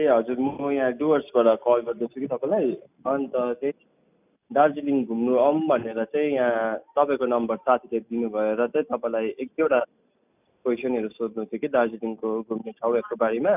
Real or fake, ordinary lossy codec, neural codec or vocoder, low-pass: real; none; none; 3.6 kHz